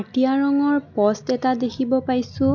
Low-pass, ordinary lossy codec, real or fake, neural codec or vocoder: 7.2 kHz; none; real; none